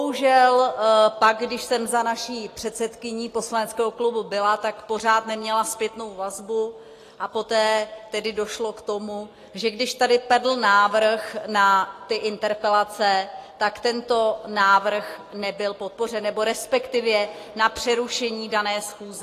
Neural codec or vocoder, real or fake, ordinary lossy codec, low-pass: none; real; AAC, 48 kbps; 14.4 kHz